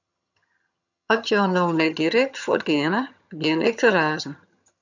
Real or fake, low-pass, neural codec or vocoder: fake; 7.2 kHz; vocoder, 22.05 kHz, 80 mel bands, HiFi-GAN